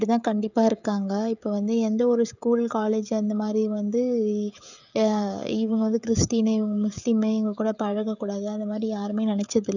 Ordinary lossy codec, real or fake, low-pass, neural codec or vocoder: none; fake; 7.2 kHz; codec, 16 kHz, 16 kbps, FreqCodec, smaller model